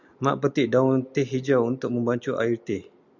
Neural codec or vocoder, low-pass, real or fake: none; 7.2 kHz; real